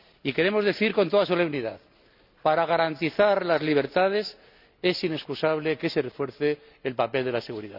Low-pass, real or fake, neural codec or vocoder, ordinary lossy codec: 5.4 kHz; real; none; none